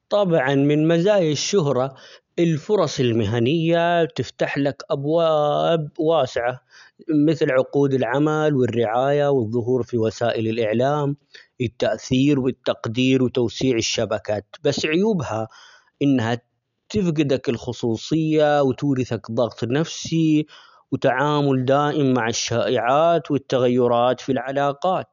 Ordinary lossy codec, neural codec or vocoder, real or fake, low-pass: none; none; real; 7.2 kHz